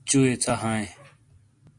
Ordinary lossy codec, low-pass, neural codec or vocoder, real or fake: MP3, 48 kbps; 10.8 kHz; none; real